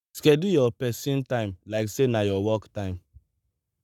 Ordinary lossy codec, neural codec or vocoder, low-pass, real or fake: none; codec, 44.1 kHz, 7.8 kbps, Pupu-Codec; 19.8 kHz; fake